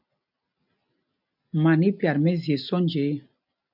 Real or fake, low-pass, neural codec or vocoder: real; 5.4 kHz; none